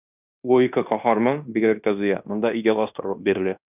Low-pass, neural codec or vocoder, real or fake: 3.6 kHz; codec, 16 kHz in and 24 kHz out, 0.9 kbps, LongCat-Audio-Codec, fine tuned four codebook decoder; fake